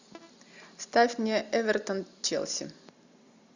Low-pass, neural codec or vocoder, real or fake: 7.2 kHz; none; real